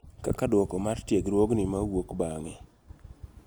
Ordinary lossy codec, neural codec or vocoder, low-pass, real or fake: none; none; none; real